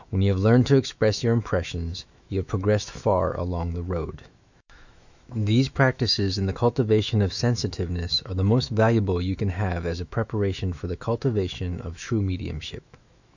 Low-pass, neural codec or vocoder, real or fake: 7.2 kHz; autoencoder, 48 kHz, 128 numbers a frame, DAC-VAE, trained on Japanese speech; fake